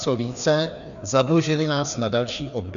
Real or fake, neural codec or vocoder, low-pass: fake; codec, 16 kHz, 2 kbps, FreqCodec, larger model; 7.2 kHz